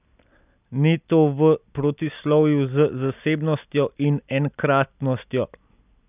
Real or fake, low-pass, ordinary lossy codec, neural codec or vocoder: real; 3.6 kHz; none; none